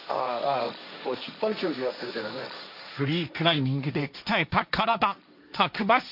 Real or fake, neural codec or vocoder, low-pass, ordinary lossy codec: fake; codec, 16 kHz, 1.1 kbps, Voila-Tokenizer; 5.4 kHz; none